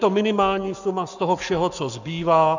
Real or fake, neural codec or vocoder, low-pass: fake; codec, 44.1 kHz, 7.8 kbps, Pupu-Codec; 7.2 kHz